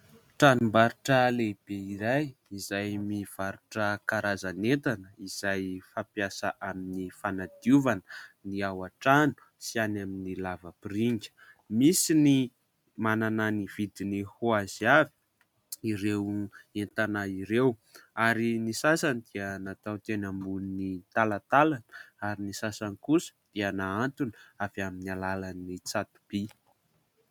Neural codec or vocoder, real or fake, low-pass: none; real; 19.8 kHz